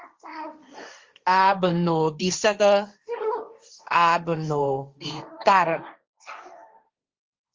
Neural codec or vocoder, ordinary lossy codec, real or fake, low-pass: codec, 16 kHz, 1.1 kbps, Voila-Tokenizer; Opus, 32 kbps; fake; 7.2 kHz